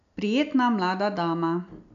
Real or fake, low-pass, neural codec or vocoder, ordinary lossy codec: real; 7.2 kHz; none; none